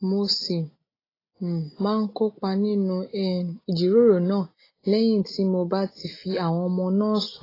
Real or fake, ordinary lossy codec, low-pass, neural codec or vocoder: real; AAC, 24 kbps; 5.4 kHz; none